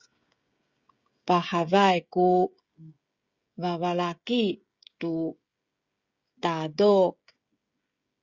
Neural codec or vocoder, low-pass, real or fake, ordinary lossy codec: codec, 16 kHz, 16 kbps, FreqCodec, smaller model; 7.2 kHz; fake; Opus, 64 kbps